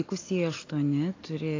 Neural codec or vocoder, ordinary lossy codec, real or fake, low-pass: none; AAC, 32 kbps; real; 7.2 kHz